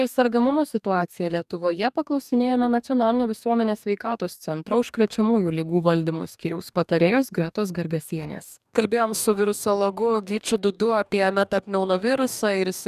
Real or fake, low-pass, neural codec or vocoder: fake; 14.4 kHz; codec, 44.1 kHz, 2.6 kbps, DAC